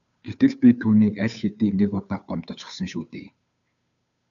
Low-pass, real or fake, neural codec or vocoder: 7.2 kHz; fake; codec, 16 kHz, 2 kbps, FunCodec, trained on Chinese and English, 25 frames a second